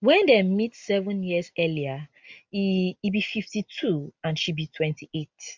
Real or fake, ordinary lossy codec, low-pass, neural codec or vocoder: real; MP3, 64 kbps; 7.2 kHz; none